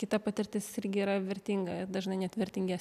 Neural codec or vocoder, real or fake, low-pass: none; real; 14.4 kHz